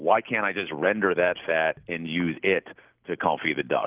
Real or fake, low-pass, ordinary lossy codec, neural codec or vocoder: real; 3.6 kHz; Opus, 32 kbps; none